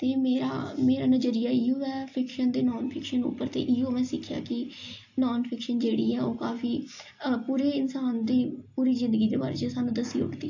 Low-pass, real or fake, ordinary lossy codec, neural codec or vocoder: 7.2 kHz; real; AAC, 48 kbps; none